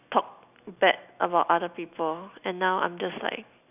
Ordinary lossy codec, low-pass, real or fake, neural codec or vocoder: none; 3.6 kHz; real; none